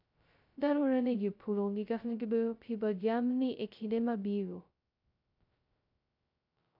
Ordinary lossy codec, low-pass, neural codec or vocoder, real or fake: none; 5.4 kHz; codec, 16 kHz, 0.2 kbps, FocalCodec; fake